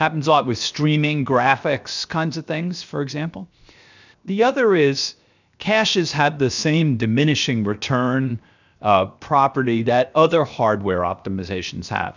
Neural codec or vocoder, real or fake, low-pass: codec, 16 kHz, 0.7 kbps, FocalCodec; fake; 7.2 kHz